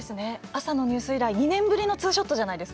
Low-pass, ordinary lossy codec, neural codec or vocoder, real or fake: none; none; none; real